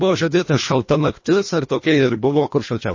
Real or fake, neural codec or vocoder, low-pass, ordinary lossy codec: fake; codec, 24 kHz, 1.5 kbps, HILCodec; 7.2 kHz; MP3, 32 kbps